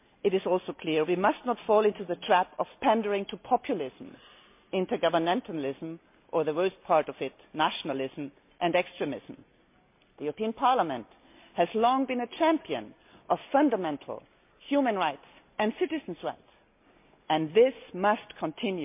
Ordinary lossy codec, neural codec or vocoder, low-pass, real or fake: MP3, 32 kbps; none; 3.6 kHz; real